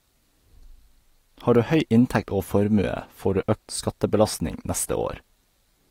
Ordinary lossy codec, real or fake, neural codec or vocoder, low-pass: AAC, 48 kbps; real; none; 19.8 kHz